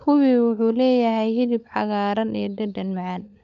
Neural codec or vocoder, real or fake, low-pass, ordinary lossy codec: codec, 16 kHz, 16 kbps, FunCodec, trained on LibriTTS, 50 frames a second; fake; 7.2 kHz; none